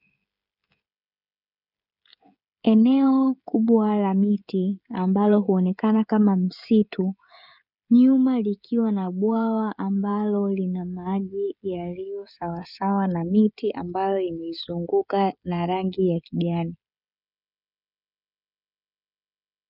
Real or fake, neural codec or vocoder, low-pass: fake; codec, 16 kHz, 16 kbps, FreqCodec, smaller model; 5.4 kHz